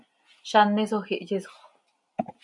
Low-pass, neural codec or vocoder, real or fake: 10.8 kHz; none; real